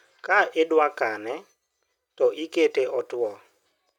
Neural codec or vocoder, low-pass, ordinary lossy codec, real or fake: none; 19.8 kHz; none; real